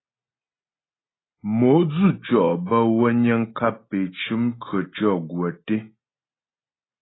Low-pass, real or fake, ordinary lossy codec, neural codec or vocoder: 7.2 kHz; real; AAC, 16 kbps; none